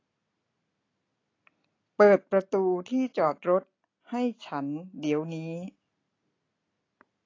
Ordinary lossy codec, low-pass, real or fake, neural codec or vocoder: AAC, 32 kbps; 7.2 kHz; real; none